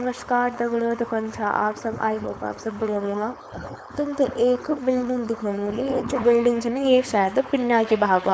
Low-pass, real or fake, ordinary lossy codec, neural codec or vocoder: none; fake; none; codec, 16 kHz, 4.8 kbps, FACodec